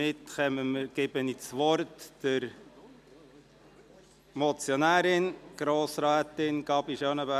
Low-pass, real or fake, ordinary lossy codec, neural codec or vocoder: 14.4 kHz; real; none; none